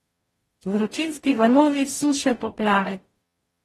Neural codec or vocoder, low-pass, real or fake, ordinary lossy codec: codec, 44.1 kHz, 0.9 kbps, DAC; 19.8 kHz; fake; AAC, 32 kbps